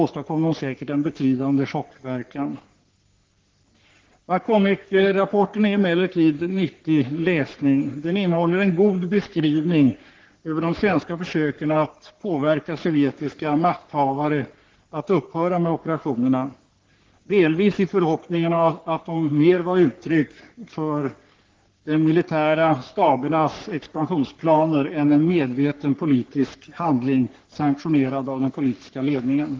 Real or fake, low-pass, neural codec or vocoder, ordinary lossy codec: fake; 7.2 kHz; codec, 44.1 kHz, 3.4 kbps, Pupu-Codec; Opus, 16 kbps